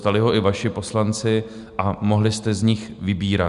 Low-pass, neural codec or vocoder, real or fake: 10.8 kHz; none; real